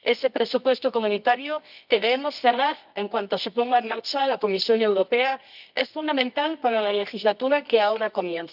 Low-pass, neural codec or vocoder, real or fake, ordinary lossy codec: 5.4 kHz; codec, 24 kHz, 0.9 kbps, WavTokenizer, medium music audio release; fake; none